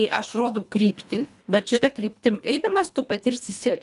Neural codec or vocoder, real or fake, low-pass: codec, 24 kHz, 1.5 kbps, HILCodec; fake; 10.8 kHz